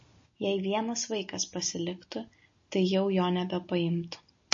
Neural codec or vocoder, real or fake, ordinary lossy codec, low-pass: none; real; MP3, 32 kbps; 7.2 kHz